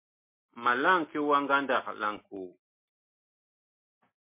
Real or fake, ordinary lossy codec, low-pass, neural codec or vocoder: real; MP3, 24 kbps; 3.6 kHz; none